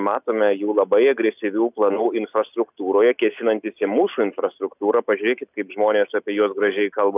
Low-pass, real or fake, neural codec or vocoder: 3.6 kHz; real; none